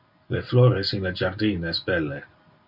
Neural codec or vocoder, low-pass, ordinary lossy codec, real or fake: none; 5.4 kHz; MP3, 48 kbps; real